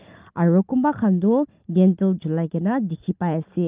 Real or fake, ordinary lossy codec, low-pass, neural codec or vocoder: real; Opus, 32 kbps; 3.6 kHz; none